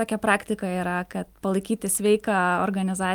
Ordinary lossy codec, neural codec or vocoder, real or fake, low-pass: Opus, 32 kbps; none; real; 19.8 kHz